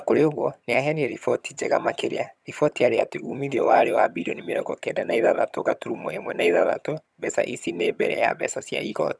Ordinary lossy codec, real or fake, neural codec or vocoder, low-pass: none; fake; vocoder, 22.05 kHz, 80 mel bands, HiFi-GAN; none